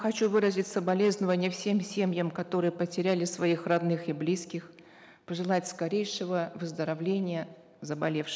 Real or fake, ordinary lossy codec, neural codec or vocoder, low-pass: real; none; none; none